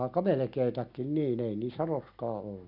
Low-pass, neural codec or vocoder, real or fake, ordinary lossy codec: 5.4 kHz; none; real; none